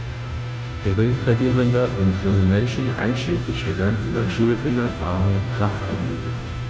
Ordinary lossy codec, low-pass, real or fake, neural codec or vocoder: none; none; fake; codec, 16 kHz, 0.5 kbps, FunCodec, trained on Chinese and English, 25 frames a second